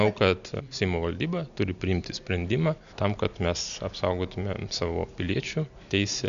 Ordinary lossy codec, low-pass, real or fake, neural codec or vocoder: MP3, 96 kbps; 7.2 kHz; real; none